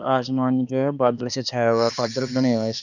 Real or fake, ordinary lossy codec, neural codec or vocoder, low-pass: fake; none; codec, 16 kHz, 2 kbps, X-Codec, HuBERT features, trained on balanced general audio; 7.2 kHz